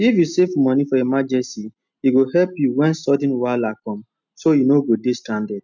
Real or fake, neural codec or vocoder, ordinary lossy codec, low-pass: real; none; none; 7.2 kHz